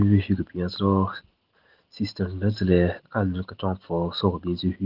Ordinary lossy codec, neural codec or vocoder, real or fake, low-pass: Opus, 16 kbps; none; real; 5.4 kHz